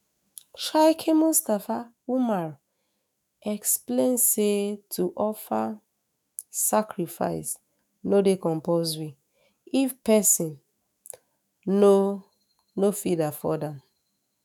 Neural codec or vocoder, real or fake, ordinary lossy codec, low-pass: autoencoder, 48 kHz, 128 numbers a frame, DAC-VAE, trained on Japanese speech; fake; none; none